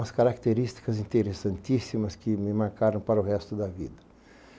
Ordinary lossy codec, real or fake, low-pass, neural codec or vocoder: none; real; none; none